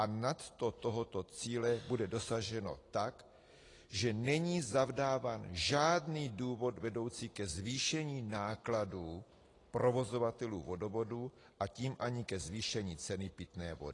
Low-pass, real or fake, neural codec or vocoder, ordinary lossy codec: 10.8 kHz; real; none; AAC, 32 kbps